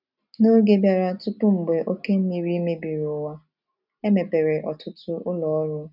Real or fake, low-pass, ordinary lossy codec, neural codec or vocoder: real; 5.4 kHz; none; none